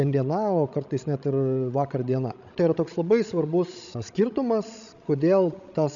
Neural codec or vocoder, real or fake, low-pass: codec, 16 kHz, 16 kbps, FreqCodec, larger model; fake; 7.2 kHz